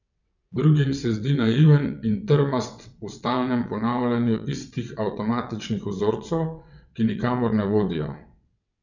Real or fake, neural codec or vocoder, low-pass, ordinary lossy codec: fake; vocoder, 22.05 kHz, 80 mel bands, WaveNeXt; 7.2 kHz; none